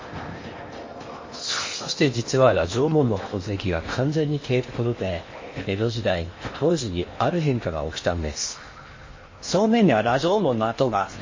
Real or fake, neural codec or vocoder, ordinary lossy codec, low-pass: fake; codec, 16 kHz in and 24 kHz out, 0.8 kbps, FocalCodec, streaming, 65536 codes; MP3, 32 kbps; 7.2 kHz